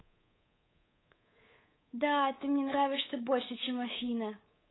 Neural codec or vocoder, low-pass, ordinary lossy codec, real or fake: codec, 24 kHz, 3.1 kbps, DualCodec; 7.2 kHz; AAC, 16 kbps; fake